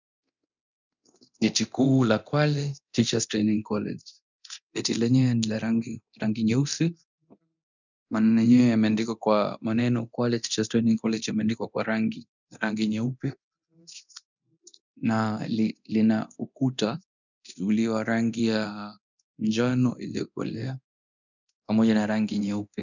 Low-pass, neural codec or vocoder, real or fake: 7.2 kHz; codec, 24 kHz, 0.9 kbps, DualCodec; fake